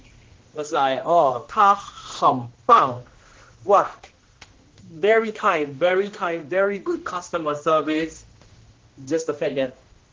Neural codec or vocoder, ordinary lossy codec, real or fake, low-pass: codec, 16 kHz, 1 kbps, X-Codec, HuBERT features, trained on general audio; Opus, 16 kbps; fake; 7.2 kHz